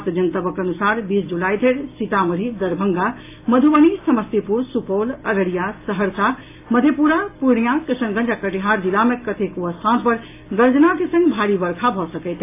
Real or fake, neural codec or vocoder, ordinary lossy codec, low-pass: real; none; AAC, 24 kbps; 3.6 kHz